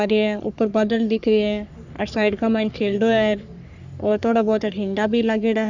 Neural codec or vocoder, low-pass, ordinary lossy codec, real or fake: codec, 44.1 kHz, 3.4 kbps, Pupu-Codec; 7.2 kHz; none; fake